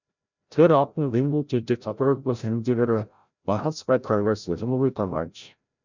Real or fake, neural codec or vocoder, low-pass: fake; codec, 16 kHz, 0.5 kbps, FreqCodec, larger model; 7.2 kHz